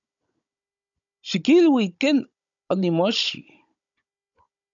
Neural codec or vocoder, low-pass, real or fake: codec, 16 kHz, 16 kbps, FunCodec, trained on Chinese and English, 50 frames a second; 7.2 kHz; fake